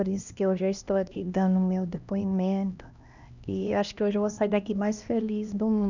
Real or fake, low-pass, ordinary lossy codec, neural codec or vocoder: fake; 7.2 kHz; none; codec, 16 kHz, 1 kbps, X-Codec, HuBERT features, trained on LibriSpeech